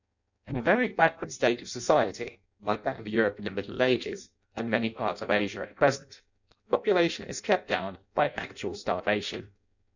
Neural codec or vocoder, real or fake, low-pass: codec, 16 kHz in and 24 kHz out, 0.6 kbps, FireRedTTS-2 codec; fake; 7.2 kHz